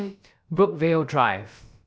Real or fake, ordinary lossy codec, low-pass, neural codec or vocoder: fake; none; none; codec, 16 kHz, about 1 kbps, DyCAST, with the encoder's durations